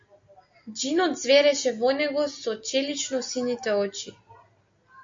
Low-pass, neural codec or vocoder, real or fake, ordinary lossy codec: 7.2 kHz; none; real; MP3, 48 kbps